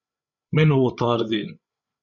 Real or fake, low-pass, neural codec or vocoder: fake; 7.2 kHz; codec, 16 kHz, 8 kbps, FreqCodec, larger model